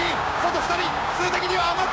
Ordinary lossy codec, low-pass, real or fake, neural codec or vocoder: none; none; fake; codec, 16 kHz, 6 kbps, DAC